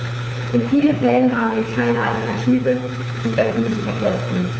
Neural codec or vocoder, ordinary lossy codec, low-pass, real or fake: codec, 16 kHz, 4 kbps, FunCodec, trained on LibriTTS, 50 frames a second; none; none; fake